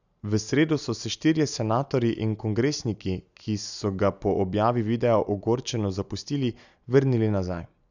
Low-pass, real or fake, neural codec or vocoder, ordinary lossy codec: 7.2 kHz; real; none; none